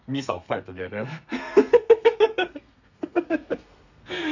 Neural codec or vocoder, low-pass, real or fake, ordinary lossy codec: codec, 32 kHz, 1.9 kbps, SNAC; 7.2 kHz; fake; none